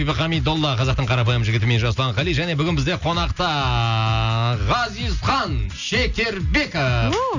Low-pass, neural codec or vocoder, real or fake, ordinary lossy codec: 7.2 kHz; none; real; none